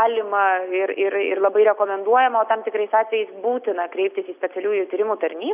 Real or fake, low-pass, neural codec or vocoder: real; 3.6 kHz; none